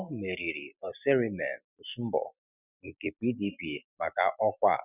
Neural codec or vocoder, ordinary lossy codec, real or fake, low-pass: none; none; real; 3.6 kHz